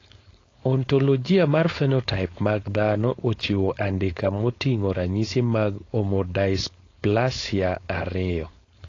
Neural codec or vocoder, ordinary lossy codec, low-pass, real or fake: codec, 16 kHz, 4.8 kbps, FACodec; AAC, 32 kbps; 7.2 kHz; fake